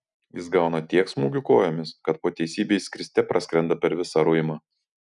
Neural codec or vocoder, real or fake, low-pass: none; real; 10.8 kHz